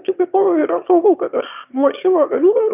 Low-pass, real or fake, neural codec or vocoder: 3.6 kHz; fake; autoencoder, 22.05 kHz, a latent of 192 numbers a frame, VITS, trained on one speaker